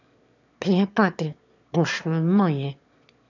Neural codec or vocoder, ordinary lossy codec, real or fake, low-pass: autoencoder, 22.05 kHz, a latent of 192 numbers a frame, VITS, trained on one speaker; none; fake; 7.2 kHz